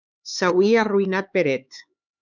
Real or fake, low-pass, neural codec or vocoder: fake; 7.2 kHz; codec, 16 kHz, 4 kbps, X-Codec, HuBERT features, trained on LibriSpeech